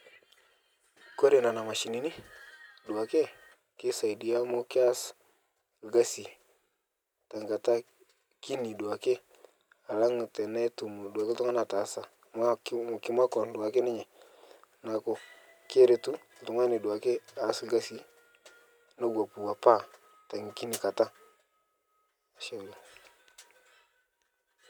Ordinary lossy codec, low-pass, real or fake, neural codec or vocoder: none; none; real; none